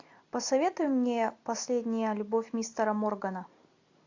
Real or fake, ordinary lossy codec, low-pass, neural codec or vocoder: real; AAC, 48 kbps; 7.2 kHz; none